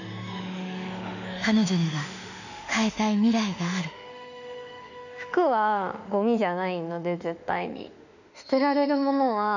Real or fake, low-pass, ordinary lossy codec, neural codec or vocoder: fake; 7.2 kHz; none; autoencoder, 48 kHz, 32 numbers a frame, DAC-VAE, trained on Japanese speech